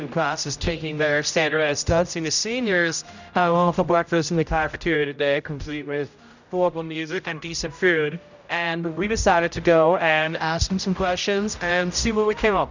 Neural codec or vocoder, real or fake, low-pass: codec, 16 kHz, 0.5 kbps, X-Codec, HuBERT features, trained on general audio; fake; 7.2 kHz